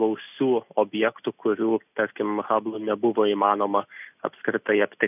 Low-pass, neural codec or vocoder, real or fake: 3.6 kHz; none; real